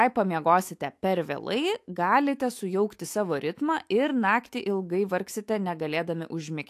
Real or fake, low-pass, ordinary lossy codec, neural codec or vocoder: fake; 14.4 kHz; AAC, 64 kbps; autoencoder, 48 kHz, 128 numbers a frame, DAC-VAE, trained on Japanese speech